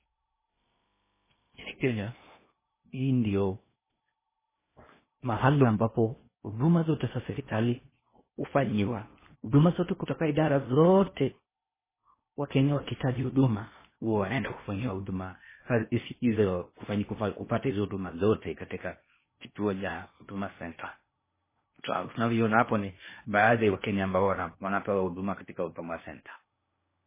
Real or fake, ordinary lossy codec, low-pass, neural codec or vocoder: fake; MP3, 16 kbps; 3.6 kHz; codec, 16 kHz in and 24 kHz out, 0.8 kbps, FocalCodec, streaming, 65536 codes